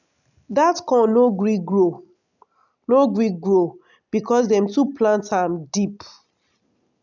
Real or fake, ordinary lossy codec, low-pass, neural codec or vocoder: real; none; 7.2 kHz; none